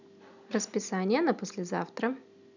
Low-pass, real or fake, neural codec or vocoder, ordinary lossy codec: 7.2 kHz; real; none; none